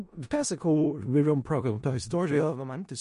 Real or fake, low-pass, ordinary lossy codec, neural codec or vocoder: fake; 10.8 kHz; MP3, 48 kbps; codec, 16 kHz in and 24 kHz out, 0.4 kbps, LongCat-Audio-Codec, four codebook decoder